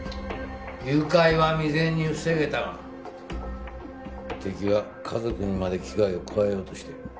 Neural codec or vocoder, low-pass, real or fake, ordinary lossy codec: none; none; real; none